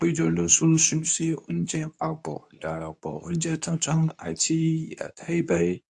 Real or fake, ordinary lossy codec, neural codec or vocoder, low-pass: fake; AAC, 64 kbps; codec, 24 kHz, 0.9 kbps, WavTokenizer, medium speech release version 2; 10.8 kHz